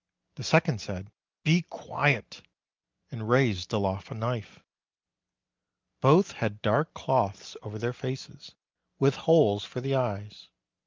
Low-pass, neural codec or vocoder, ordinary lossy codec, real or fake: 7.2 kHz; none; Opus, 24 kbps; real